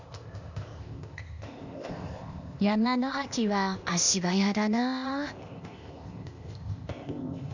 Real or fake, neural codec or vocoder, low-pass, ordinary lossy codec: fake; codec, 16 kHz, 0.8 kbps, ZipCodec; 7.2 kHz; none